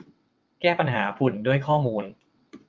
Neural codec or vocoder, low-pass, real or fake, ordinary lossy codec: vocoder, 22.05 kHz, 80 mel bands, WaveNeXt; 7.2 kHz; fake; Opus, 32 kbps